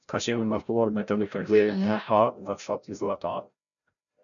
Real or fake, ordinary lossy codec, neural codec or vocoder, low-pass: fake; MP3, 96 kbps; codec, 16 kHz, 0.5 kbps, FreqCodec, larger model; 7.2 kHz